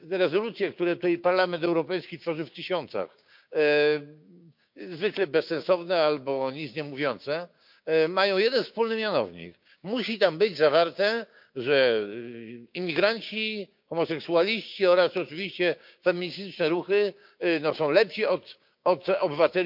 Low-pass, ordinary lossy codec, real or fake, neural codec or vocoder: 5.4 kHz; MP3, 48 kbps; fake; codec, 16 kHz, 6 kbps, DAC